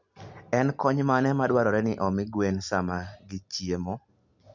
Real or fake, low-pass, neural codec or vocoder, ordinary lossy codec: real; 7.2 kHz; none; none